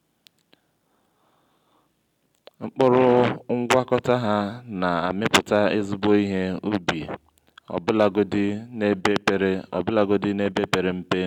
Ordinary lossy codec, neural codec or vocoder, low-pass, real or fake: none; none; 19.8 kHz; real